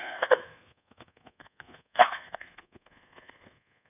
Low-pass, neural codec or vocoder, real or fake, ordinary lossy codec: 3.6 kHz; codec, 24 kHz, 1.2 kbps, DualCodec; fake; none